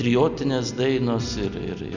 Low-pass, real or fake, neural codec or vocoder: 7.2 kHz; real; none